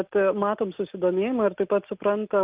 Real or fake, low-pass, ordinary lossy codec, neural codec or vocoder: real; 3.6 kHz; Opus, 32 kbps; none